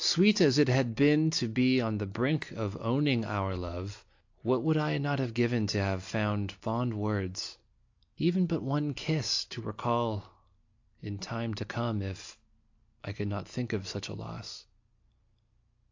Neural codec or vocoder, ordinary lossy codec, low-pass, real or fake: none; AAC, 48 kbps; 7.2 kHz; real